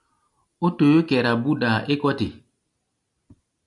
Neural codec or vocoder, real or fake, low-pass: none; real; 10.8 kHz